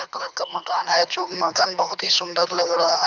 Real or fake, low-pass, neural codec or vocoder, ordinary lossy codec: fake; 7.2 kHz; codec, 24 kHz, 3 kbps, HILCodec; none